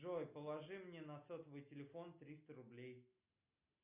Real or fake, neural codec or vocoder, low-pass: real; none; 3.6 kHz